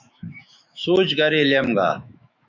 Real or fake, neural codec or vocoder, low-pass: fake; autoencoder, 48 kHz, 128 numbers a frame, DAC-VAE, trained on Japanese speech; 7.2 kHz